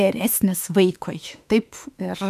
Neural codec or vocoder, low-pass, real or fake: autoencoder, 48 kHz, 32 numbers a frame, DAC-VAE, trained on Japanese speech; 14.4 kHz; fake